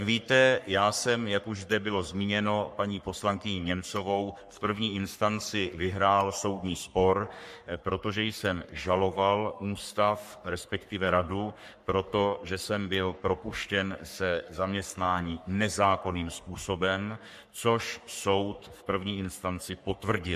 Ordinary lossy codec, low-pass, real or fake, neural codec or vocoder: MP3, 64 kbps; 14.4 kHz; fake; codec, 44.1 kHz, 3.4 kbps, Pupu-Codec